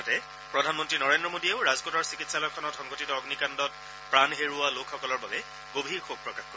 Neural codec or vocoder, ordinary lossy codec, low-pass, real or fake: none; none; none; real